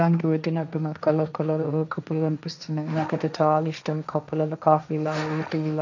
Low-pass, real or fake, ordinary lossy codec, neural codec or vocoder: 7.2 kHz; fake; none; codec, 16 kHz, 1.1 kbps, Voila-Tokenizer